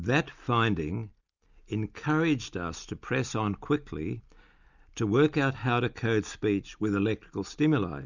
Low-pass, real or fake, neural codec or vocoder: 7.2 kHz; real; none